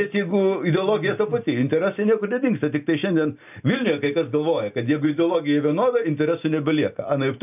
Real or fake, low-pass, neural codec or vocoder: real; 3.6 kHz; none